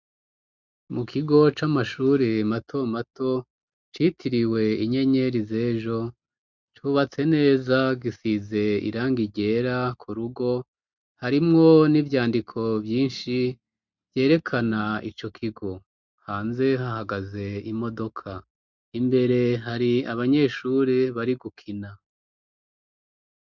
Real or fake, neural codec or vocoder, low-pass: real; none; 7.2 kHz